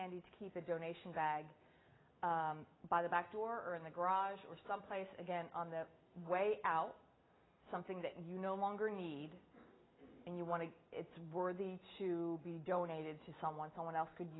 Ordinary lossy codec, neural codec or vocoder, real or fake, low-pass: AAC, 16 kbps; none; real; 7.2 kHz